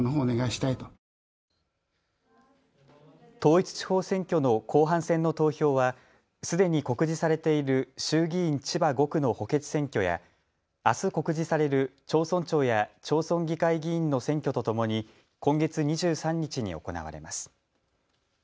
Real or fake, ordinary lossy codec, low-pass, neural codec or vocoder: real; none; none; none